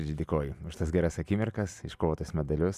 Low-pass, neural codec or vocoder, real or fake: 14.4 kHz; none; real